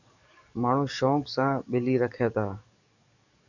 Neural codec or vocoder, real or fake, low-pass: codec, 44.1 kHz, 7.8 kbps, DAC; fake; 7.2 kHz